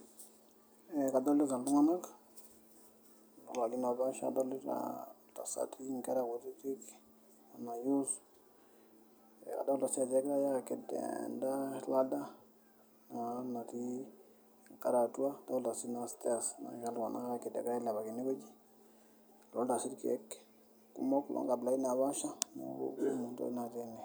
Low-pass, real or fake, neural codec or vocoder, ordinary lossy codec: none; real; none; none